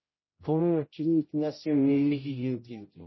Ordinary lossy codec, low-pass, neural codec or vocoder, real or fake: MP3, 24 kbps; 7.2 kHz; codec, 16 kHz, 0.5 kbps, X-Codec, HuBERT features, trained on general audio; fake